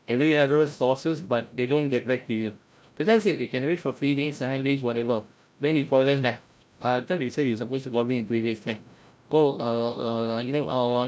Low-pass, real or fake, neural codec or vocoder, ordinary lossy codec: none; fake; codec, 16 kHz, 0.5 kbps, FreqCodec, larger model; none